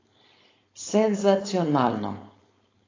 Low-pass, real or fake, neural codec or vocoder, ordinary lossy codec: 7.2 kHz; fake; codec, 16 kHz, 4.8 kbps, FACodec; AAC, 32 kbps